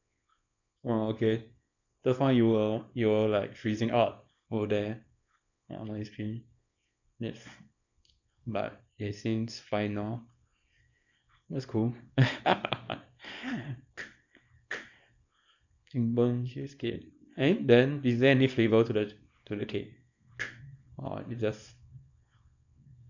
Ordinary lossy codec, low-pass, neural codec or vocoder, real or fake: none; 7.2 kHz; codec, 24 kHz, 0.9 kbps, WavTokenizer, small release; fake